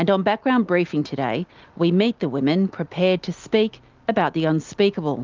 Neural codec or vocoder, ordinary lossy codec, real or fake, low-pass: none; Opus, 32 kbps; real; 7.2 kHz